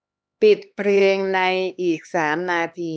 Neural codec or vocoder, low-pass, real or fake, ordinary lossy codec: codec, 16 kHz, 2 kbps, X-Codec, HuBERT features, trained on LibriSpeech; none; fake; none